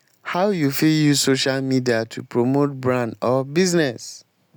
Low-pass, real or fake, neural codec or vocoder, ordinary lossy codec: none; real; none; none